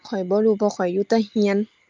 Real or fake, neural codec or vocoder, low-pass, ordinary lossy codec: real; none; 7.2 kHz; Opus, 24 kbps